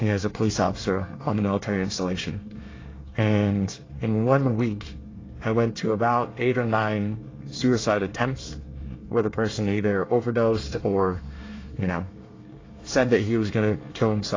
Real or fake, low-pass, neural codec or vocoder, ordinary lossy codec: fake; 7.2 kHz; codec, 24 kHz, 1 kbps, SNAC; AAC, 32 kbps